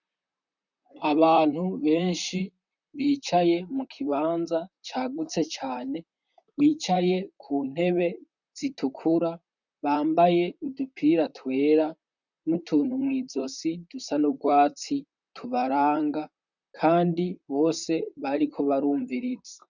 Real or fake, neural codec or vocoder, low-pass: fake; vocoder, 44.1 kHz, 128 mel bands, Pupu-Vocoder; 7.2 kHz